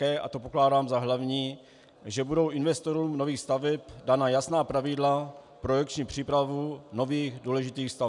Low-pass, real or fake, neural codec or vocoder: 10.8 kHz; real; none